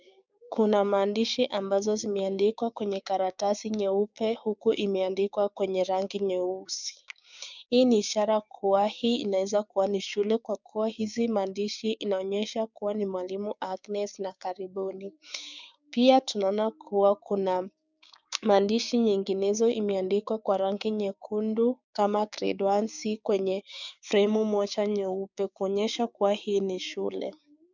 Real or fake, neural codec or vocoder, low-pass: fake; codec, 16 kHz, 6 kbps, DAC; 7.2 kHz